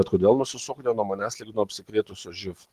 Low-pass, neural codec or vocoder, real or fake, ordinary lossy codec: 14.4 kHz; none; real; Opus, 16 kbps